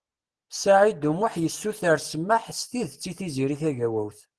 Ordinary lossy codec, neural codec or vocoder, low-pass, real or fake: Opus, 16 kbps; none; 10.8 kHz; real